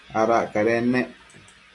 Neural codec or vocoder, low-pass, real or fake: none; 10.8 kHz; real